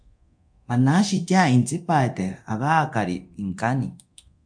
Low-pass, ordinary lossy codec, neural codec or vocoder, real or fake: 9.9 kHz; MP3, 64 kbps; codec, 24 kHz, 0.9 kbps, DualCodec; fake